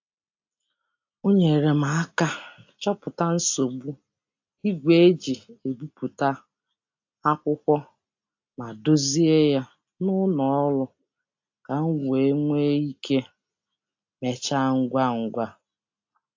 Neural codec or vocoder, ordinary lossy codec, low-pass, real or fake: none; AAC, 48 kbps; 7.2 kHz; real